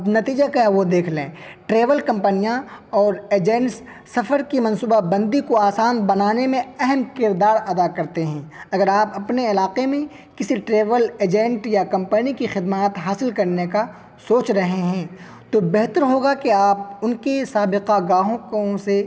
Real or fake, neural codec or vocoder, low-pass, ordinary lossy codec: real; none; none; none